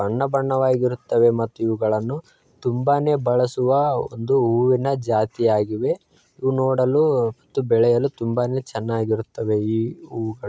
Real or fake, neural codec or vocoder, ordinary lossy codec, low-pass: real; none; none; none